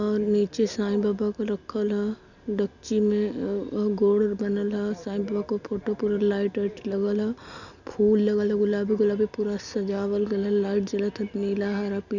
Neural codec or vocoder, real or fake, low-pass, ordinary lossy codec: none; real; 7.2 kHz; none